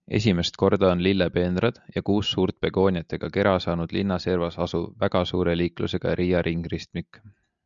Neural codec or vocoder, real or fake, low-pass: none; real; 7.2 kHz